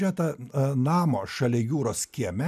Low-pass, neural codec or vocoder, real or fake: 14.4 kHz; none; real